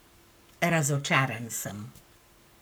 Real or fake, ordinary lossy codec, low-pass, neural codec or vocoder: fake; none; none; codec, 44.1 kHz, 7.8 kbps, Pupu-Codec